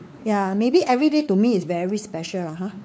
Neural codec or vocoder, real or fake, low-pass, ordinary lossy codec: codec, 16 kHz, 4 kbps, X-Codec, WavLM features, trained on Multilingual LibriSpeech; fake; none; none